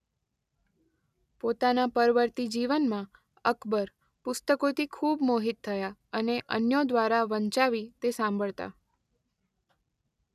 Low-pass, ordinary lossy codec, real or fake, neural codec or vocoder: 14.4 kHz; none; real; none